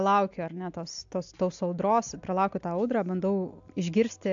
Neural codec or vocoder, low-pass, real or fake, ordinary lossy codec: none; 7.2 kHz; real; AAC, 64 kbps